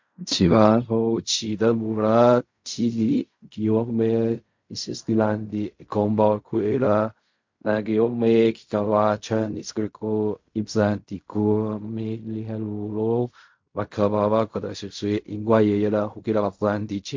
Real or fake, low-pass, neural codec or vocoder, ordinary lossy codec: fake; 7.2 kHz; codec, 16 kHz in and 24 kHz out, 0.4 kbps, LongCat-Audio-Codec, fine tuned four codebook decoder; MP3, 48 kbps